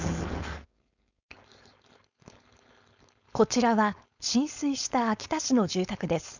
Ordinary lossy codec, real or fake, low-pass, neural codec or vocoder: none; fake; 7.2 kHz; codec, 16 kHz, 4.8 kbps, FACodec